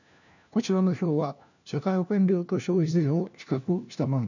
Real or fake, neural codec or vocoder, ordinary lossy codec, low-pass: fake; codec, 16 kHz, 1 kbps, FunCodec, trained on LibriTTS, 50 frames a second; none; 7.2 kHz